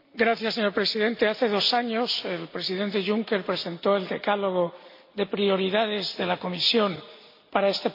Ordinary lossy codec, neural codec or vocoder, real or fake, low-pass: MP3, 32 kbps; none; real; 5.4 kHz